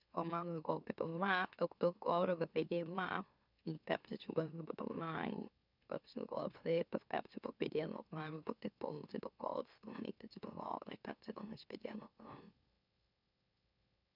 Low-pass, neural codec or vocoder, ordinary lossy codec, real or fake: 5.4 kHz; autoencoder, 44.1 kHz, a latent of 192 numbers a frame, MeloTTS; none; fake